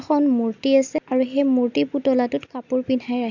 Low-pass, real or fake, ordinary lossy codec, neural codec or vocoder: 7.2 kHz; real; none; none